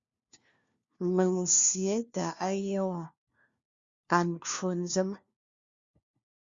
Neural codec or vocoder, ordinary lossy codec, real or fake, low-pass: codec, 16 kHz, 1 kbps, FunCodec, trained on LibriTTS, 50 frames a second; Opus, 64 kbps; fake; 7.2 kHz